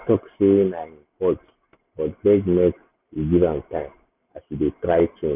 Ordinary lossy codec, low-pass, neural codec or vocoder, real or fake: none; 3.6 kHz; none; real